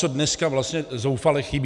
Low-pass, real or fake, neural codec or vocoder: 10.8 kHz; real; none